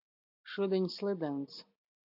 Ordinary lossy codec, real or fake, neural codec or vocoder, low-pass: AAC, 48 kbps; real; none; 5.4 kHz